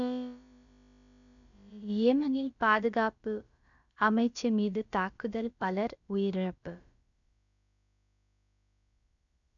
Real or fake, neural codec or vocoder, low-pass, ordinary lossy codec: fake; codec, 16 kHz, about 1 kbps, DyCAST, with the encoder's durations; 7.2 kHz; none